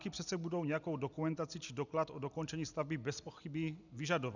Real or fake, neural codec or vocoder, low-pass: real; none; 7.2 kHz